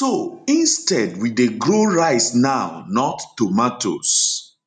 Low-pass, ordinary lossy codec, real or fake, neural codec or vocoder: 10.8 kHz; none; real; none